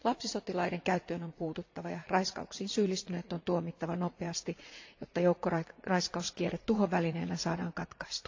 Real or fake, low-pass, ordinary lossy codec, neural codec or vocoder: fake; 7.2 kHz; AAC, 48 kbps; vocoder, 44.1 kHz, 80 mel bands, Vocos